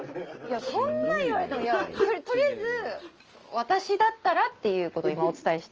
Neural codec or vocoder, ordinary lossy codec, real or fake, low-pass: none; Opus, 24 kbps; real; 7.2 kHz